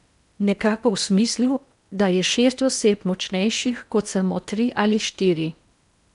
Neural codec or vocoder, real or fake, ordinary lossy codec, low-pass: codec, 16 kHz in and 24 kHz out, 0.8 kbps, FocalCodec, streaming, 65536 codes; fake; none; 10.8 kHz